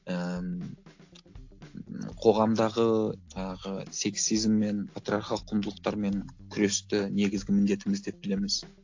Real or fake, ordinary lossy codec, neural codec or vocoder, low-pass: real; AAC, 48 kbps; none; 7.2 kHz